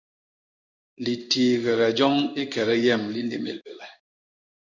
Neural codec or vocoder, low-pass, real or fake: codec, 16 kHz in and 24 kHz out, 1 kbps, XY-Tokenizer; 7.2 kHz; fake